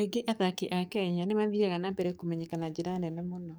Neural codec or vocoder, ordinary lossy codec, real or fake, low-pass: codec, 44.1 kHz, 7.8 kbps, DAC; none; fake; none